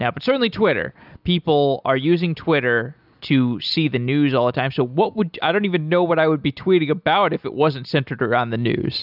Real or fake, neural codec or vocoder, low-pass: real; none; 5.4 kHz